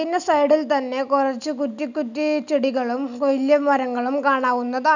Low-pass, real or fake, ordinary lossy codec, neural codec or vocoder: 7.2 kHz; real; none; none